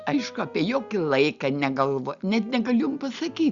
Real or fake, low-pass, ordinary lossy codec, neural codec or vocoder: real; 7.2 kHz; Opus, 64 kbps; none